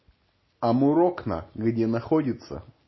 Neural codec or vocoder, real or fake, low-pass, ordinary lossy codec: none; real; 7.2 kHz; MP3, 24 kbps